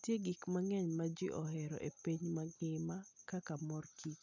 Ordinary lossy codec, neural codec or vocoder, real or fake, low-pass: none; none; real; 7.2 kHz